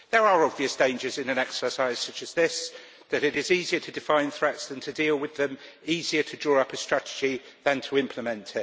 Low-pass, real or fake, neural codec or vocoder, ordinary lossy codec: none; real; none; none